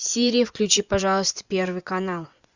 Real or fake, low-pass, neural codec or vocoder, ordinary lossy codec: fake; 7.2 kHz; vocoder, 24 kHz, 100 mel bands, Vocos; Opus, 64 kbps